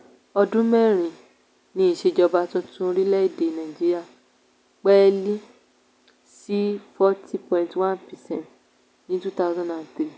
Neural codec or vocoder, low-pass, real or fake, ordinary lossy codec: none; none; real; none